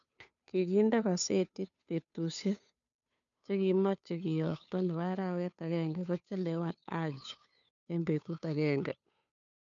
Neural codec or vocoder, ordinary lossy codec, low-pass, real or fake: codec, 16 kHz, 2 kbps, FunCodec, trained on Chinese and English, 25 frames a second; none; 7.2 kHz; fake